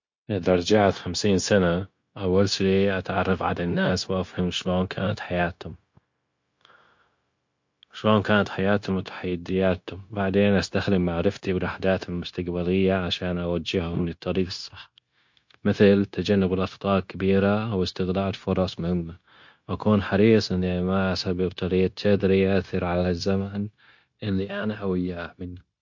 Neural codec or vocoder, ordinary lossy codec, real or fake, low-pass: codec, 16 kHz, 0.9 kbps, LongCat-Audio-Codec; MP3, 48 kbps; fake; 7.2 kHz